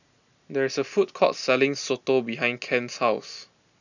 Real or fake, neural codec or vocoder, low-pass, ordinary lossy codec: real; none; 7.2 kHz; none